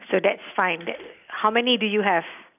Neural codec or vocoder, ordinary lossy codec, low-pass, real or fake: none; none; 3.6 kHz; real